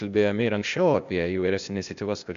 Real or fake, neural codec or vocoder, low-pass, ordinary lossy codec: fake; codec, 16 kHz, 0.8 kbps, ZipCodec; 7.2 kHz; MP3, 64 kbps